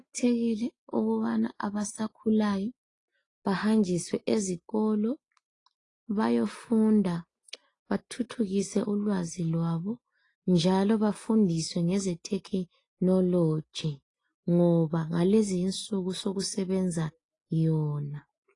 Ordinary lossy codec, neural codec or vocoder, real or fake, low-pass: AAC, 32 kbps; none; real; 10.8 kHz